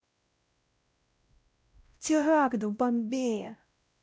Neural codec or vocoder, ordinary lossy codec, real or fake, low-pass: codec, 16 kHz, 0.5 kbps, X-Codec, WavLM features, trained on Multilingual LibriSpeech; none; fake; none